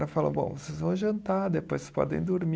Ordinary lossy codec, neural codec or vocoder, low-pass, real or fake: none; none; none; real